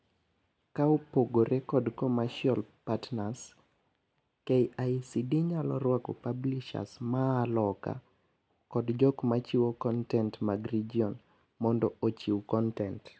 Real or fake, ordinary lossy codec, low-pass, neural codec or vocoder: real; none; none; none